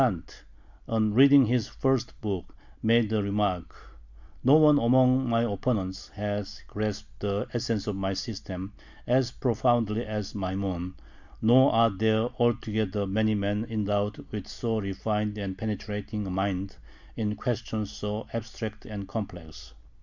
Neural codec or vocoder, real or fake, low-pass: none; real; 7.2 kHz